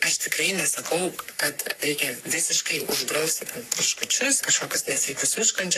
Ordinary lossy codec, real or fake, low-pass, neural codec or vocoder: AAC, 64 kbps; fake; 14.4 kHz; codec, 44.1 kHz, 3.4 kbps, Pupu-Codec